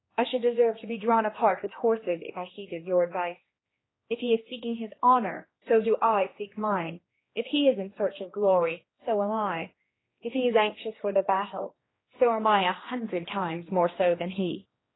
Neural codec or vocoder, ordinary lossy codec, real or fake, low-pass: codec, 16 kHz, 2 kbps, X-Codec, HuBERT features, trained on general audio; AAC, 16 kbps; fake; 7.2 kHz